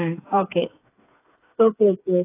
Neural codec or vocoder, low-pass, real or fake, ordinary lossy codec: codec, 16 kHz, 4 kbps, FreqCodec, smaller model; 3.6 kHz; fake; AAC, 16 kbps